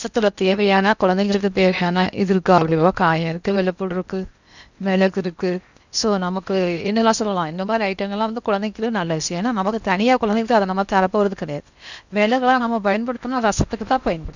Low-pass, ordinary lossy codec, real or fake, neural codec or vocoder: 7.2 kHz; none; fake; codec, 16 kHz in and 24 kHz out, 0.8 kbps, FocalCodec, streaming, 65536 codes